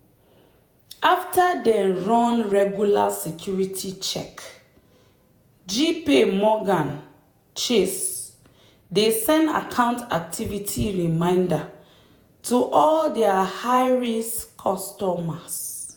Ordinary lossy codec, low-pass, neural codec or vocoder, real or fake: none; none; vocoder, 48 kHz, 128 mel bands, Vocos; fake